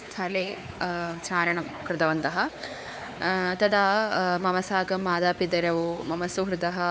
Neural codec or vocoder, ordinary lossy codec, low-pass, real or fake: codec, 16 kHz, 4 kbps, X-Codec, WavLM features, trained on Multilingual LibriSpeech; none; none; fake